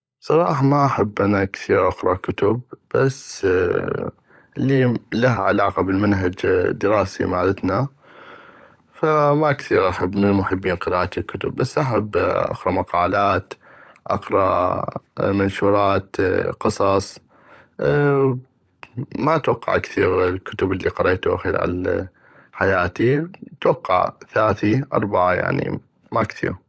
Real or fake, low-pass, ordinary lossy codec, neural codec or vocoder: fake; none; none; codec, 16 kHz, 16 kbps, FunCodec, trained on LibriTTS, 50 frames a second